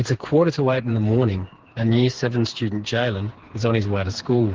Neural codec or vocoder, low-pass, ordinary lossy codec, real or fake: codec, 16 kHz, 4 kbps, FreqCodec, smaller model; 7.2 kHz; Opus, 16 kbps; fake